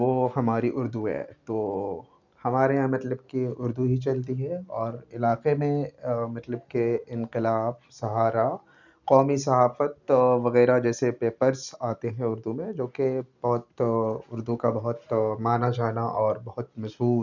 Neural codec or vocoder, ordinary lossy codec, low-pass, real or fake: none; none; 7.2 kHz; real